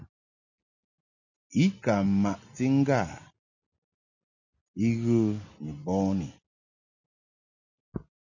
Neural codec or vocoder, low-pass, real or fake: none; 7.2 kHz; real